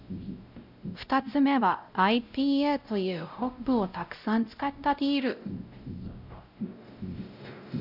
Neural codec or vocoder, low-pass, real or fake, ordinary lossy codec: codec, 16 kHz, 0.5 kbps, X-Codec, WavLM features, trained on Multilingual LibriSpeech; 5.4 kHz; fake; none